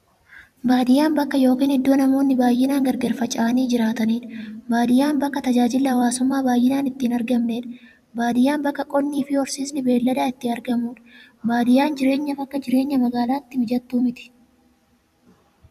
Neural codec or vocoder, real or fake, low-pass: vocoder, 44.1 kHz, 128 mel bands every 256 samples, BigVGAN v2; fake; 14.4 kHz